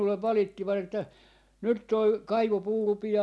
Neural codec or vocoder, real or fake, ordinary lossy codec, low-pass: none; real; none; none